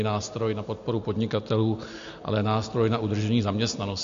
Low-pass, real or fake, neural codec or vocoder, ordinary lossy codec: 7.2 kHz; real; none; MP3, 48 kbps